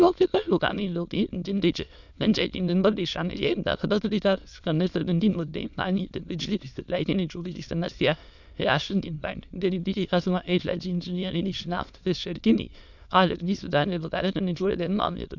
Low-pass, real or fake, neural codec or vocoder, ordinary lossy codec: 7.2 kHz; fake; autoencoder, 22.05 kHz, a latent of 192 numbers a frame, VITS, trained on many speakers; none